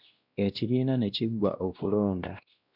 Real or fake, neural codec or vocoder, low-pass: fake; codec, 16 kHz, 1 kbps, X-Codec, WavLM features, trained on Multilingual LibriSpeech; 5.4 kHz